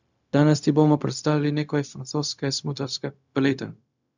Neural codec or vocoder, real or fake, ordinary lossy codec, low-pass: codec, 16 kHz, 0.4 kbps, LongCat-Audio-Codec; fake; none; 7.2 kHz